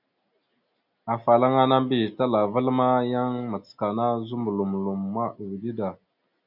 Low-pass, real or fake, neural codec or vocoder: 5.4 kHz; real; none